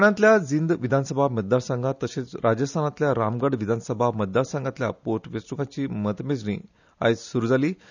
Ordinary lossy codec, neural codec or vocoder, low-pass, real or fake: none; none; 7.2 kHz; real